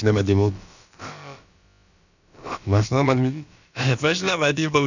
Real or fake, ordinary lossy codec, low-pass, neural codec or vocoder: fake; none; 7.2 kHz; codec, 16 kHz, about 1 kbps, DyCAST, with the encoder's durations